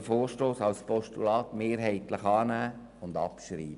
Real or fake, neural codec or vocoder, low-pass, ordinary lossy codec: real; none; 10.8 kHz; none